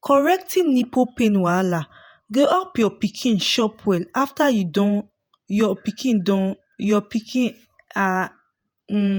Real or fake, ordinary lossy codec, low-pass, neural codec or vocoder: fake; none; none; vocoder, 48 kHz, 128 mel bands, Vocos